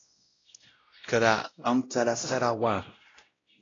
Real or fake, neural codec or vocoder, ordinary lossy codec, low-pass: fake; codec, 16 kHz, 0.5 kbps, X-Codec, WavLM features, trained on Multilingual LibriSpeech; AAC, 32 kbps; 7.2 kHz